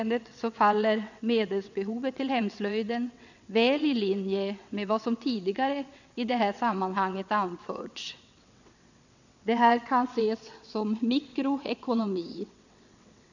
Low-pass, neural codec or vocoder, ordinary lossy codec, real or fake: 7.2 kHz; vocoder, 22.05 kHz, 80 mel bands, WaveNeXt; none; fake